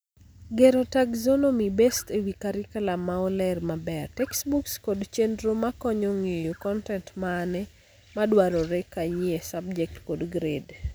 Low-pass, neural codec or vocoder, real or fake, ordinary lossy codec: none; none; real; none